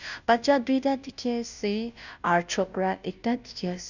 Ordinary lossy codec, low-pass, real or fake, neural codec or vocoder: none; 7.2 kHz; fake; codec, 16 kHz, 0.5 kbps, FunCodec, trained on Chinese and English, 25 frames a second